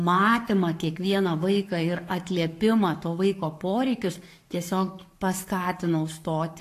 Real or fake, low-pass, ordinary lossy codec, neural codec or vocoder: fake; 14.4 kHz; AAC, 64 kbps; codec, 44.1 kHz, 7.8 kbps, Pupu-Codec